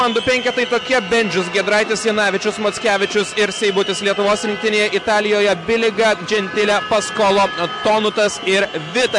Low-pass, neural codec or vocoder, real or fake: 10.8 kHz; none; real